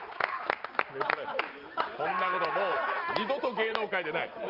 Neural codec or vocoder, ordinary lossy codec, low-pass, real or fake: none; Opus, 32 kbps; 5.4 kHz; real